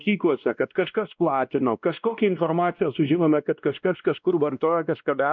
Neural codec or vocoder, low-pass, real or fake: codec, 16 kHz, 1 kbps, X-Codec, WavLM features, trained on Multilingual LibriSpeech; 7.2 kHz; fake